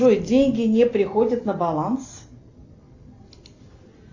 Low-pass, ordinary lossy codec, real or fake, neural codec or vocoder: 7.2 kHz; AAC, 48 kbps; real; none